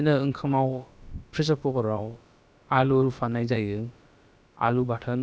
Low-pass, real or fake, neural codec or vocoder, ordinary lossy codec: none; fake; codec, 16 kHz, about 1 kbps, DyCAST, with the encoder's durations; none